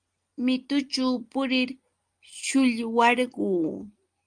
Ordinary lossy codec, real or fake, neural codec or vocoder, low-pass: Opus, 32 kbps; real; none; 9.9 kHz